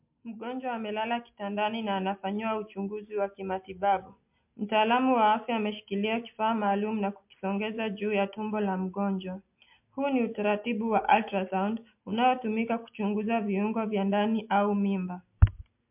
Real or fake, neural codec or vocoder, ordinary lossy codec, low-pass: real; none; MP3, 32 kbps; 3.6 kHz